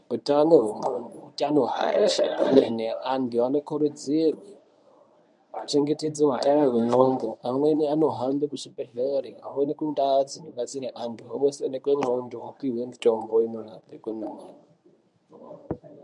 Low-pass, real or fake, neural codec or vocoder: 10.8 kHz; fake; codec, 24 kHz, 0.9 kbps, WavTokenizer, medium speech release version 1